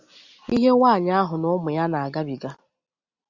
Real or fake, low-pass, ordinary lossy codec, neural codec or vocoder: real; 7.2 kHz; Opus, 64 kbps; none